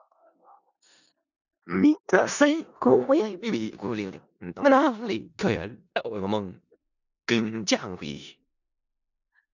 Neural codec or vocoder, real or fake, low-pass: codec, 16 kHz in and 24 kHz out, 0.4 kbps, LongCat-Audio-Codec, four codebook decoder; fake; 7.2 kHz